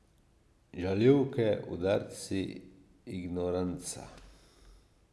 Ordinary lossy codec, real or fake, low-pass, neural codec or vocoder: none; real; none; none